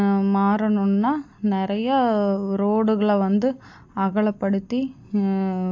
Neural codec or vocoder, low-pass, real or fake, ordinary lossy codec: none; 7.2 kHz; real; AAC, 48 kbps